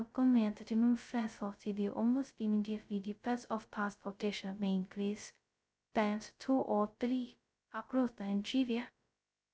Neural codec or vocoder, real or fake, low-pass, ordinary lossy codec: codec, 16 kHz, 0.2 kbps, FocalCodec; fake; none; none